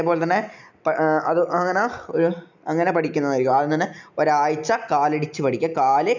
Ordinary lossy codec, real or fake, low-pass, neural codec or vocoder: none; real; 7.2 kHz; none